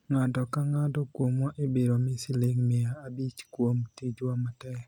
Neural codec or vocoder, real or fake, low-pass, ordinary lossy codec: none; real; 19.8 kHz; none